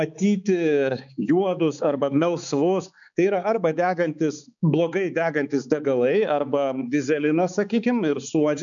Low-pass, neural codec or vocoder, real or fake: 7.2 kHz; codec, 16 kHz, 4 kbps, X-Codec, HuBERT features, trained on balanced general audio; fake